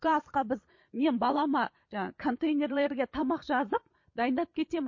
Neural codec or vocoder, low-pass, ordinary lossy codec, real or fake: vocoder, 22.05 kHz, 80 mel bands, Vocos; 7.2 kHz; MP3, 32 kbps; fake